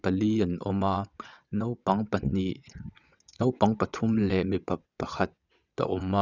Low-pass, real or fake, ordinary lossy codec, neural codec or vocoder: 7.2 kHz; fake; none; vocoder, 22.05 kHz, 80 mel bands, WaveNeXt